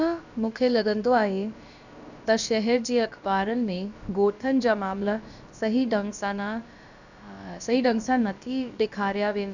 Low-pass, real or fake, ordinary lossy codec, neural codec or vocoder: 7.2 kHz; fake; none; codec, 16 kHz, about 1 kbps, DyCAST, with the encoder's durations